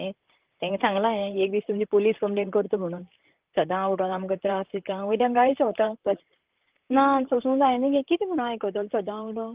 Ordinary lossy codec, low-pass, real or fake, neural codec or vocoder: Opus, 24 kbps; 3.6 kHz; fake; vocoder, 44.1 kHz, 128 mel bands, Pupu-Vocoder